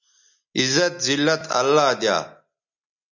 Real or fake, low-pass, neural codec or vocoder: real; 7.2 kHz; none